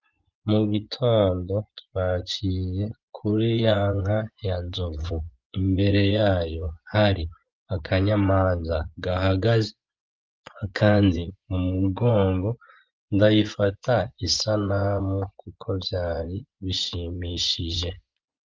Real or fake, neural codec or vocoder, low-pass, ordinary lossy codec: fake; vocoder, 24 kHz, 100 mel bands, Vocos; 7.2 kHz; Opus, 32 kbps